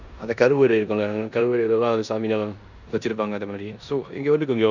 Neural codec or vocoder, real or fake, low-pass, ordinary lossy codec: codec, 16 kHz in and 24 kHz out, 0.9 kbps, LongCat-Audio-Codec, four codebook decoder; fake; 7.2 kHz; none